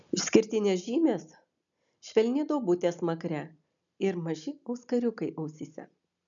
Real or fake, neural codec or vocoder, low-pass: real; none; 7.2 kHz